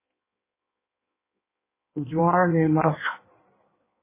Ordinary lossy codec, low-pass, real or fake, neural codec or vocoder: MP3, 16 kbps; 3.6 kHz; fake; codec, 16 kHz in and 24 kHz out, 1.1 kbps, FireRedTTS-2 codec